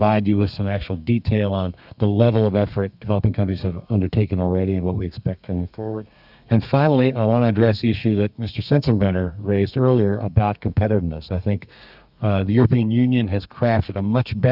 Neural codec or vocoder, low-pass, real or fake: codec, 32 kHz, 1.9 kbps, SNAC; 5.4 kHz; fake